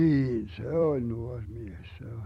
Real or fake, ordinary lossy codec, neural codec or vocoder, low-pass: fake; MP3, 64 kbps; vocoder, 44.1 kHz, 128 mel bands every 256 samples, BigVGAN v2; 19.8 kHz